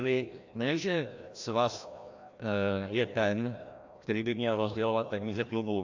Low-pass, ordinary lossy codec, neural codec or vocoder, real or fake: 7.2 kHz; AAC, 48 kbps; codec, 16 kHz, 1 kbps, FreqCodec, larger model; fake